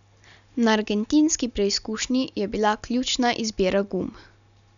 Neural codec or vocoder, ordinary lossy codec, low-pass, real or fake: none; none; 7.2 kHz; real